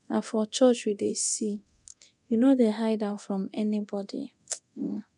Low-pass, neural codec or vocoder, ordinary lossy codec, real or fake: 10.8 kHz; codec, 24 kHz, 0.9 kbps, DualCodec; none; fake